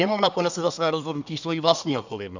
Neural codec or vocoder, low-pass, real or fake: codec, 24 kHz, 1 kbps, SNAC; 7.2 kHz; fake